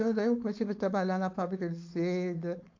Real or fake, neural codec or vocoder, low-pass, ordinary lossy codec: fake; codec, 16 kHz, 4.8 kbps, FACodec; 7.2 kHz; AAC, 48 kbps